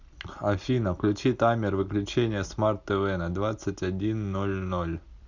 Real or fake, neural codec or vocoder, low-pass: real; none; 7.2 kHz